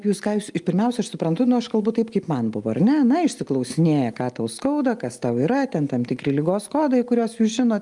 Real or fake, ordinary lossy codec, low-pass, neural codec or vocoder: real; Opus, 32 kbps; 10.8 kHz; none